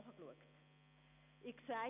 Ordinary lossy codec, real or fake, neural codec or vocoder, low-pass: none; real; none; 3.6 kHz